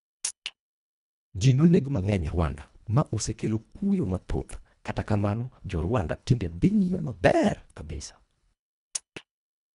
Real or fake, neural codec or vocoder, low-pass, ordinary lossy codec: fake; codec, 24 kHz, 1.5 kbps, HILCodec; 10.8 kHz; none